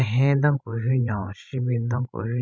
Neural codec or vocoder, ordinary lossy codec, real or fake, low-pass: codec, 16 kHz, 16 kbps, FreqCodec, larger model; none; fake; none